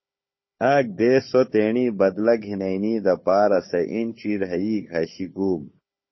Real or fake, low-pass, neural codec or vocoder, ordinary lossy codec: fake; 7.2 kHz; codec, 16 kHz, 4 kbps, FunCodec, trained on Chinese and English, 50 frames a second; MP3, 24 kbps